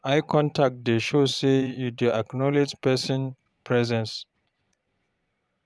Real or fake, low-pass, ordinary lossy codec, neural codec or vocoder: fake; none; none; vocoder, 22.05 kHz, 80 mel bands, Vocos